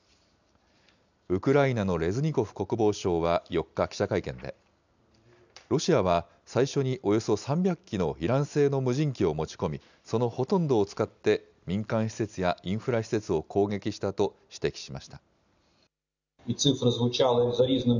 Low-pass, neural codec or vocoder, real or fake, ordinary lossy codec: 7.2 kHz; none; real; none